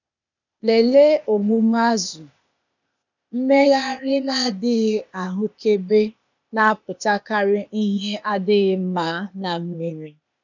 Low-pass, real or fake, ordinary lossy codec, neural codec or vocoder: 7.2 kHz; fake; none; codec, 16 kHz, 0.8 kbps, ZipCodec